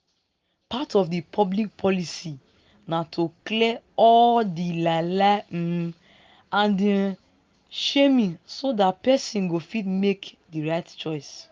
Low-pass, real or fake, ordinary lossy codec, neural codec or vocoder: 7.2 kHz; real; Opus, 32 kbps; none